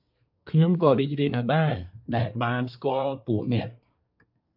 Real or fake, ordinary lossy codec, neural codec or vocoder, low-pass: fake; AAC, 48 kbps; codec, 24 kHz, 1 kbps, SNAC; 5.4 kHz